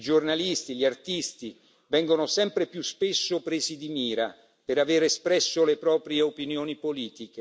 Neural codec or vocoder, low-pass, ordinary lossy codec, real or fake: none; none; none; real